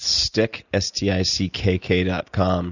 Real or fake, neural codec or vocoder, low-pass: real; none; 7.2 kHz